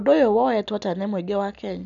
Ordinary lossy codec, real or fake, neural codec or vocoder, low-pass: none; real; none; 7.2 kHz